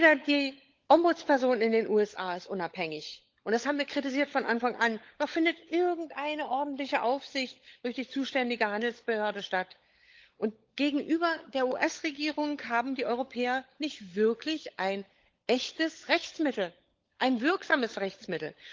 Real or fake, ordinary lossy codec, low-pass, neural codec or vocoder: fake; Opus, 32 kbps; 7.2 kHz; codec, 16 kHz, 16 kbps, FunCodec, trained on LibriTTS, 50 frames a second